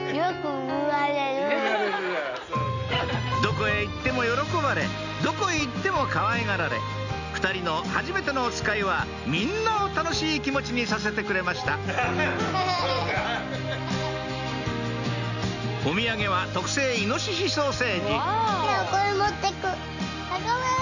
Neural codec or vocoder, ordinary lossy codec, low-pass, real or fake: none; none; 7.2 kHz; real